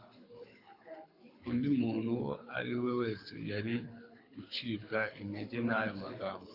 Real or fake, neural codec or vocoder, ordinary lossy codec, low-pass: fake; codec, 24 kHz, 6 kbps, HILCodec; AAC, 32 kbps; 5.4 kHz